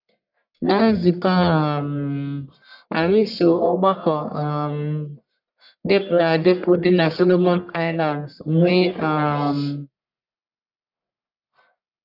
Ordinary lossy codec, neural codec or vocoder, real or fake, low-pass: none; codec, 44.1 kHz, 1.7 kbps, Pupu-Codec; fake; 5.4 kHz